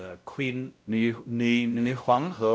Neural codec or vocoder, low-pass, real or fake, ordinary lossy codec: codec, 16 kHz, 0.5 kbps, X-Codec, WavLM features, trained on Multilingual LibriSpeech; none; fake; none